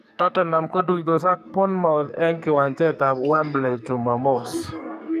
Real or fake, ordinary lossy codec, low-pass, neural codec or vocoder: fake; none; 14.4 kHz; codec, 44.1 kHz, 2.6 kbps, SNAC